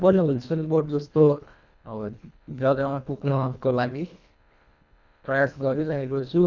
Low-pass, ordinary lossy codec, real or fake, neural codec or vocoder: 7.2 kHz; none; fake; codec, 24 kHz, 1.5 kbps, HILCodec